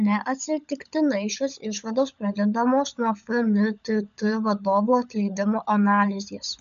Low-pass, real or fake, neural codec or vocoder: 7.2 kHz; fake; codec, 16 kHz, 16 kbps, FunCodec, trained on LibriTTS, 50 frames a second